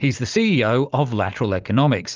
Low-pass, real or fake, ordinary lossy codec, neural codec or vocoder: 7.2 kHz; real; Opus, 24 kbps; none